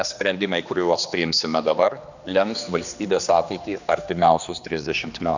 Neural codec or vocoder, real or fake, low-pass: codec, 16 kHz, 2 kbps, X-Codec, HuBERT features, trained on general audio; fake; 7.2 kHz